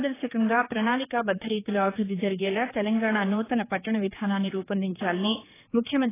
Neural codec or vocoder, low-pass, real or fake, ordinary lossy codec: codec, 16 kHz, 4 kbps, X-Codec, HuBERT features, trained on general audio; 3.6 kHz; fake; AAC, 16 kbps